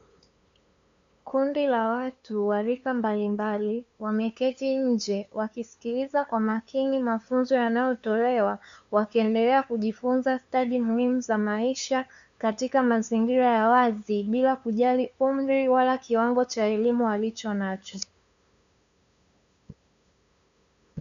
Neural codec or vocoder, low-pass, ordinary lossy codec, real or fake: codec, 16 kHz, 2 kbps, FunCodec, trained on LibriTTS, 25 frames a second; 7.2 kHz; MP3, 96 kbps; fake